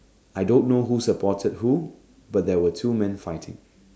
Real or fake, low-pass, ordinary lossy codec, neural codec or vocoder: real; none; none; none